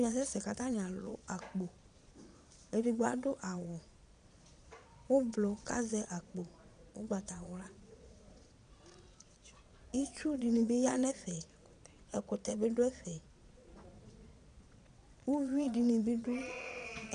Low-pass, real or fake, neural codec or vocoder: 9.9 kHz; fake; vocoder, 22.05 kHz, 80 mel bands, Vocos